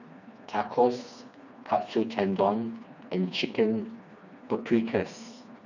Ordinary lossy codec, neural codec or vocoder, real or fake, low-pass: none; codec, 16 kHz, 2 kbps, FreqCodec, smaller model; fake; 7.2 kHz